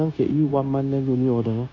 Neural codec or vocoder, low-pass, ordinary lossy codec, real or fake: codec, 16 kHz, 0.9 kbps, LongCat-Audio-Codec; 7.2 kHz; none; fake